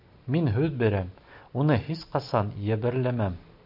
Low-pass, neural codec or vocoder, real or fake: 5.4 kHz; none; real